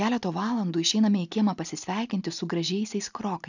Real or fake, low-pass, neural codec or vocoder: real; 7.2 kHz; none